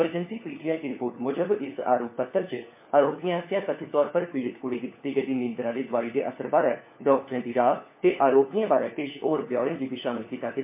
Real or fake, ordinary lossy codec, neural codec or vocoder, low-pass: fake; MP3, 24 kbps; codec, 16 kHz, 4 kbps, FunCodec, trained on LibriTTS, 50 frames a second; 3.6 kHz